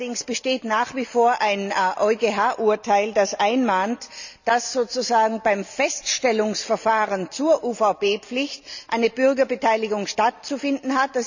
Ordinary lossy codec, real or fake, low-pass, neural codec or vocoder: none; real; 7.2 kHz; none